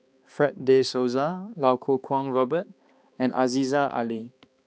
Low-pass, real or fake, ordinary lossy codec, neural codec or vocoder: none; fake; none; codec, 16 kHz, 2 kbps, X-Codec, HuBERT features, trained on balanced general audio